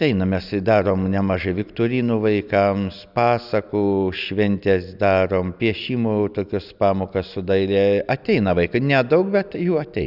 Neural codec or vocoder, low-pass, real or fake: none; 5.4 kHz; real